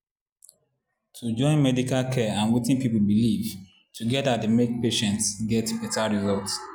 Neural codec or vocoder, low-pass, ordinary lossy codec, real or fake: none; none; none; real